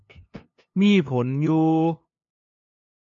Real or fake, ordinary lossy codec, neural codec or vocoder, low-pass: fake; MP3, 48 kbps; codec, 16 kHz, 2 kbps, FunCodec, trained on LibriTTS, 25 frames a second; 7.2 kHz